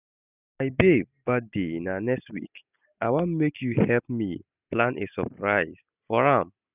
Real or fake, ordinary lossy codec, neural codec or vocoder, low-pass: real; none; none; 3.6 kHz